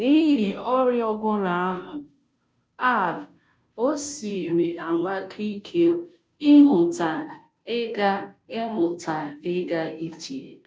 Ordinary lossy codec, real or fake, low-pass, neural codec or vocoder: none; fake; none; codec, 16 kHz, 0.5 kbps, FunCodec, trained on Chinese and English, 25 frames a second